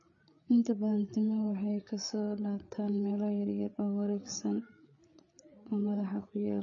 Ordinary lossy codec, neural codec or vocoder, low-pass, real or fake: MP3, 32 kbps; codec, 16 kHz, 8 kbps, FreqCodec, larger model; 7.2 kHz; fake